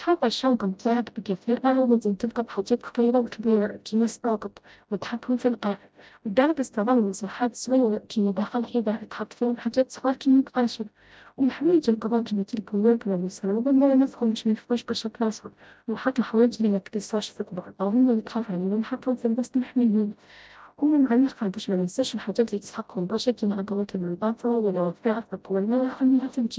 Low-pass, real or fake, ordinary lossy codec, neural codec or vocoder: none; fake; none; codec, 16 kHz, 0.5 kbps, FreqCodec, smaller model